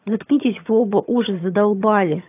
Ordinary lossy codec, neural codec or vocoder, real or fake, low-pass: AAC, 32 kbps; vocoder, 22.05 kHz, 80 mel bands, HiFi-GAN; fake; 3.6 kHz